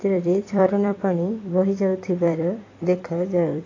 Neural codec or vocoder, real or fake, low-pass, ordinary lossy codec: vocoder, 44.1 kHz, 128 mel bands every 256 samples, BigVGAN v2; fake; 7.2 kHz; AAC, 32 kbps